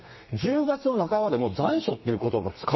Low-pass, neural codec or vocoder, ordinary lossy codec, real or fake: 7.2 kHz; codec, 32 kHz, 1.9 kbps, SNAC; MP3, 24 kbps; fake